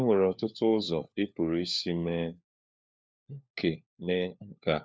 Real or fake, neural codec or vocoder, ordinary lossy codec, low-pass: fake; codec, 16 kHz, 4 kbps, FunCodec, trained on LibriTTS, 50 frames a second; none; none